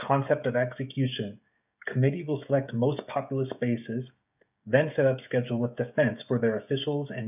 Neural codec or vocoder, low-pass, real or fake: codec, 16 kHz, 16 kbps, FreqCodec, smaller model; 3.6 kHz; fake